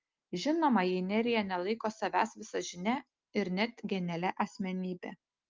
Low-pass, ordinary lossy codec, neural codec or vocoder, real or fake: 7.2 kHz; Opus, 24 kbps; none; real